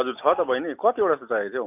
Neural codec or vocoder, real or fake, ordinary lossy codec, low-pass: none; real; none; 3.6 kHz